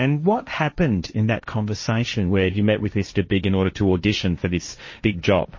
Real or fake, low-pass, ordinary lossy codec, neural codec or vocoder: fake; 7.2 kHz; MP3, 32 kbps; codec, 16 kHz, 1.1 kbps, Voila-Tokenizer